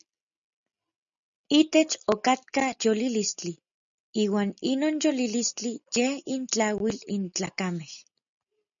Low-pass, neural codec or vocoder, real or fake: 7.2 kHz; none; real